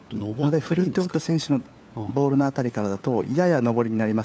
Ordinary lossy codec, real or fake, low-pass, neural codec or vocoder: none; fake; none; codec, 16 kHz, 4 kbps, FunCodec, trained on LibriTTS, 50 frames a second